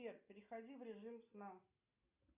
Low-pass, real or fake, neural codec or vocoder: 3.6 kHz; real; none